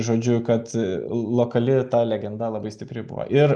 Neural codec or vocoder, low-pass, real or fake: none; 9.9 kHz; real